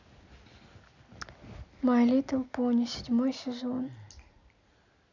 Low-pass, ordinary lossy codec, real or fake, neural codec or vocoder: 7.2 kHz; none; real; none